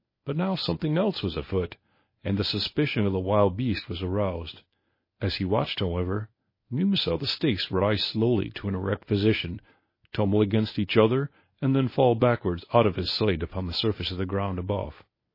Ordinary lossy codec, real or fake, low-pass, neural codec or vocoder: MP3, 24 kbps; fake; 5.4 kHz; codec, 24 kHz, 0.9 kbps, WavTokenizer, medium speech release version 1